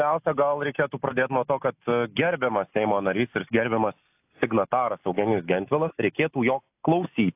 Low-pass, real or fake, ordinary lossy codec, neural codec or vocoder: 3.6 kHz; real; AAC, 32 kbps; none